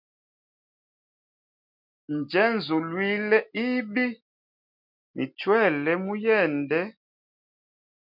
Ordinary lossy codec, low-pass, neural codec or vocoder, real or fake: MP3, 48 kbps; 5.4 kHz; none; real